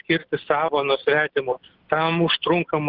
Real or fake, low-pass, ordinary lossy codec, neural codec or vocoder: real; 5.4 kHz; Opus, 16 kbps; none